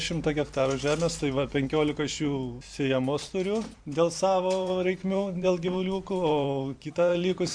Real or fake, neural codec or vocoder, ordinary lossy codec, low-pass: fake; vocoder, 44.1 kHz, 128 mel bands every 512 samples, BigVGAN v2; MP3, 64 kbps; 9.9 kHz